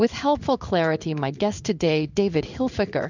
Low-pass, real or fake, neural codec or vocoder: 7.2 kHz; fake; codec, 16 kHz in and 24 kHz out, 1 kbps, XY-Tokenizer